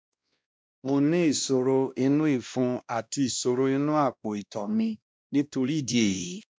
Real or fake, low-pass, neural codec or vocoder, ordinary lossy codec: fake; none; codec, 16 kHz, 1 kbps, X-Codec, WavLM features, trained on Multilingual LibriSpeech; none